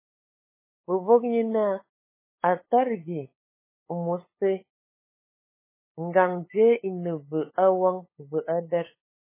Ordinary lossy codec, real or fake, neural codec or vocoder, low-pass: MP3, 16 kbps; fake; codec, 16 kHz, 8 kbps, FunCodec, trained on LibriTTS, 25 frames a second; 3.6 kHz